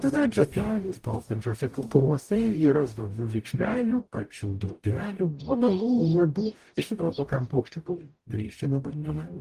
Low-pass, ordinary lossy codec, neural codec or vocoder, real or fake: 14.4 kHz; Opus, 32 kbps; codec, 44.1 kHz, 0.9 kbps, DAC; fake